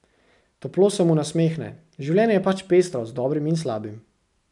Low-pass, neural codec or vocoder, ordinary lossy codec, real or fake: 10.8 kHz; none; none; real